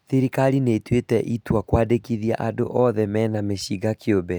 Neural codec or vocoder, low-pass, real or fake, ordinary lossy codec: none; none; real; none